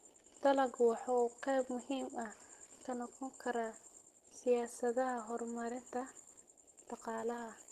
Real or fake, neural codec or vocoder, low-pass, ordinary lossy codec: real; none; 14.4 kHz; Opus, 16 kbps